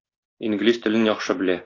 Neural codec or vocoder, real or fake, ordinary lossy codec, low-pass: none; real; AAC, 32 kbps; 7.2 kHz